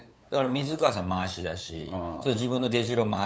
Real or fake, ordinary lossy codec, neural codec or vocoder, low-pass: fake; none; codec, 16 kHz, 8 kbps, FunCodec, trained on LibriTTS, 25 frames a second; none